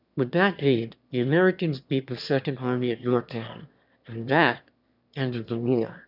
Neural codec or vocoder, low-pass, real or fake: autoencoder, 22.05 kHz, a latent of 192 numbers a frame, VITS, trained on one speaker; 5.4 kHz; fake